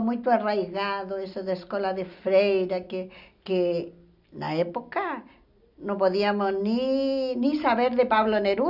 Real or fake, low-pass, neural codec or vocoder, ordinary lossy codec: real; 5.4 kHz; none; none